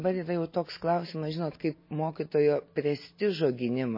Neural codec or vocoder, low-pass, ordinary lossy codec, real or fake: none; 5.4 kHz; MP3, 24 kbps; real